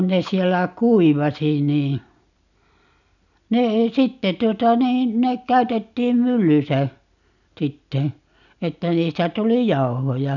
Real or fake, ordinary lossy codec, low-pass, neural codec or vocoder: real; none; 7.2 kHz; none